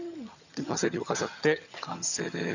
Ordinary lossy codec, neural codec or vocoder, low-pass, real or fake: none; vocoder, 22.05 kHz, 80 mel bands, HiFi-GAN; 7.2 kHz; fake